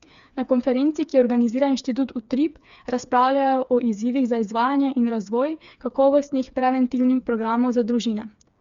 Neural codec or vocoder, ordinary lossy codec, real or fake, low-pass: codec, 16 kHz, 4 kbps, FreqCodec, smaller model; Opus, 64 kbps; fake; 7.2 kHz